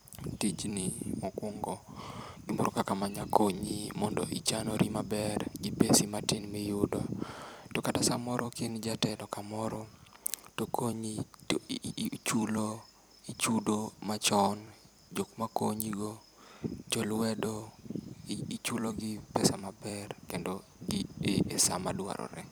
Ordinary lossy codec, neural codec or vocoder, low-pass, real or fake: none; vocoder, 44.1 kHz, 128 mel bands every 256 samples, BigVGAN v2; none; fake